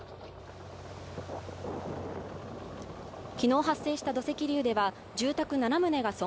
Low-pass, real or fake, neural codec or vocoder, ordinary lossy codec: none; real; none; none